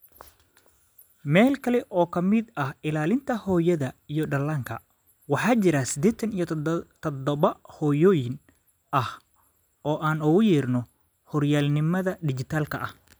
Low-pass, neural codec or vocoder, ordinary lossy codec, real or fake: none; none; none; real